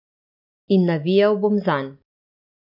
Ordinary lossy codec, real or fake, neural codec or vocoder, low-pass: none; real; none; 5.4 kHz